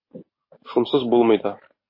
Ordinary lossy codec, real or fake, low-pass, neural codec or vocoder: MP3, 24 kbps; real; 5.4 kHz; none